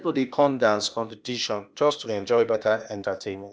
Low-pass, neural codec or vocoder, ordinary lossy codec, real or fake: none; codec, 16 kHz, 0.8 kbps, ZipCodec; none; fake